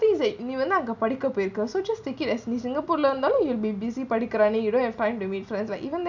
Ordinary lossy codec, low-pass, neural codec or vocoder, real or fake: none; 7.2 kHz; none; real